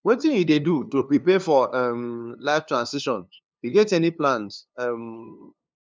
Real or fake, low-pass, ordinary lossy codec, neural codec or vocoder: fake; none; none; codec, 16 kHz, 2 kbps, FunCodec, trained on LibriTTS, 25 frames a second